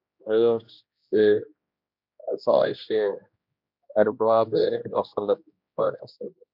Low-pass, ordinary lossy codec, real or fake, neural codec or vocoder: 5.4 kHz; MP3, 48 kbps; fake; codec, 16 kHz, 1 kbps, X-Codec, HuBERT features, trained on general audio